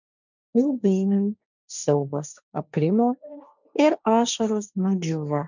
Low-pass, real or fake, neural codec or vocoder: 7.2 kHz; fake; codec, 16 kHz, 1.1 kbps, Voila-Tokenizer